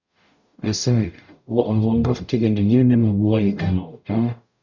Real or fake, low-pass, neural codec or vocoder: fake; 7.2 kHz; codec, 44.1 kHz, 0.9 kbps, DAC